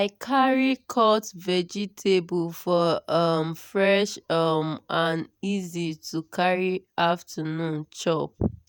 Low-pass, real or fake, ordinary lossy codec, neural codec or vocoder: none; fake; none; vocoder, 48 kHz, 128 mel bands, Vocos